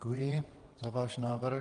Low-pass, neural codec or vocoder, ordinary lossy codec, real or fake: 9.9 kHz; vocoder, 22.05 kHz, 80 mel bands, WaveNeXt; Opus, 24 kbps; fake